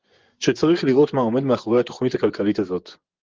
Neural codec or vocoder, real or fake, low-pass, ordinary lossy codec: vocoder, 24 kHz, 100 mel bands, Vocos; fake; 7.2 kHz; Opus, 16 kbps